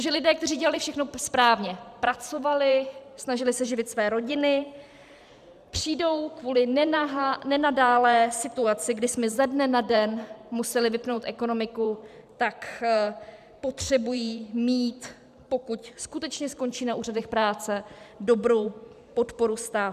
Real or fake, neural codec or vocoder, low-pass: fake; vocoder, 44.1 kHz, 128 mel bands every 512 samples, BigVGAN v2; 14.4 kHz